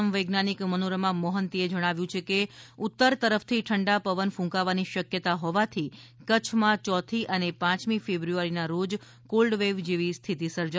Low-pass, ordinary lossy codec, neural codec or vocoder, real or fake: none; none; none; real